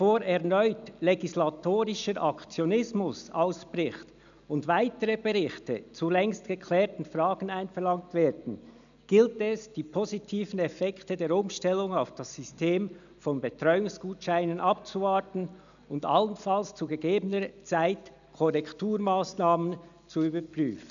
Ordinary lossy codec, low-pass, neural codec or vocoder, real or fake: none; 7.2 kHz; none; real